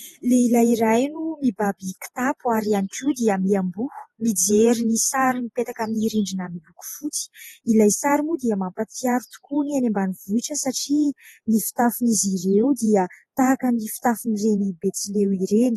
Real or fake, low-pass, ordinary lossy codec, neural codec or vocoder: fake; 19.8 kHz; AAC, 32 kbps; vocoder, 48 kHz, 128 mel bands, Vocos